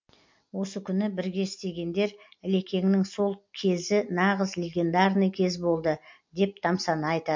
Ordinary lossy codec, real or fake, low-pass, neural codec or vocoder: MP3, 48 kbps; real; 7.2 kHz; none